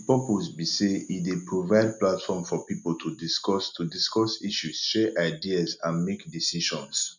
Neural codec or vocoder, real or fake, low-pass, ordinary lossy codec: none; real; 7.2 kHz; none